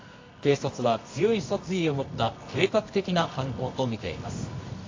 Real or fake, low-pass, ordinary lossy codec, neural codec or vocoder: fake; 7.2 kHz; AAC, 32 kbps; codec, 24 kHz, 0.9 kbps, WavTokenizer, medium music audio release